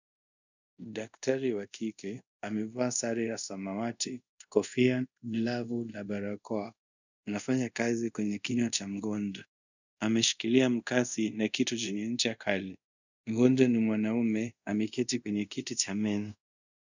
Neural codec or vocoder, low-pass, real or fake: codec, 24 kHz, 0.5 kbps, DualCodec; 7.2 kHz; fake